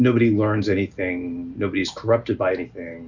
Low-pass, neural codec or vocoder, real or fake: 7.2 kHz; none; real